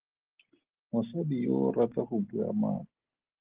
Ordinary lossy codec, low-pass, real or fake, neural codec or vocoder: Opus, 16 kbps; 3.6 kHz; real; none